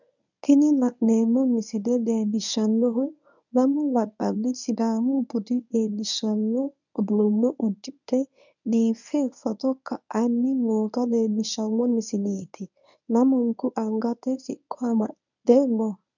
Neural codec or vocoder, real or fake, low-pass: codec, 24 kHz, 0.9 kbps, WavTokenizer, medium speech release version 1; fake; 7.2 kHz